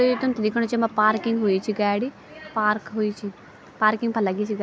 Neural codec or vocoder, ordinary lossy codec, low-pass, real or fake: none; none; none; real